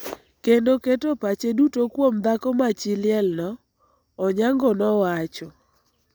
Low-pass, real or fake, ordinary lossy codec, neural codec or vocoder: none; real; none; none